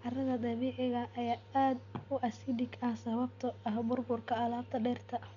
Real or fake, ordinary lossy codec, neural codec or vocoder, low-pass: real; none; none; 7.2 kHz